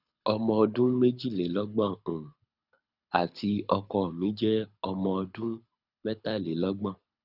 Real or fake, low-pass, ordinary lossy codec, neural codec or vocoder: fake; 5.4 kHz; none; codec, 24 kHz, 6 kbps, HILCodec